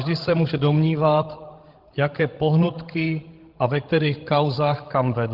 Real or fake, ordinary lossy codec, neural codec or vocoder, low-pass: fake; Opus, 16 kbps; codec, 16 kHz, 16 kbps, FreqCodec, larger model; 5.4 kHz